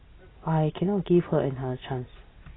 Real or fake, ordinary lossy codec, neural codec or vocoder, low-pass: real; AAC, 16 kbps; none; 7.2 kHz